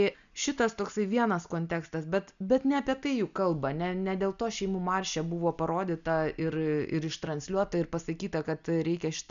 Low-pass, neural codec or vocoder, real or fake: 7.2 kHz; none; real